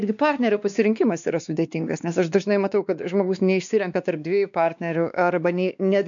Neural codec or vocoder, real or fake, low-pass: codec, 16 kHz, 2 kbps, X-Codec, WavLM features, trained on Multilingual LibriSpeech; fake; 7.2 kHz